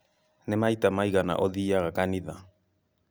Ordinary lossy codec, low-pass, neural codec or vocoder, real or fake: none; none; none; real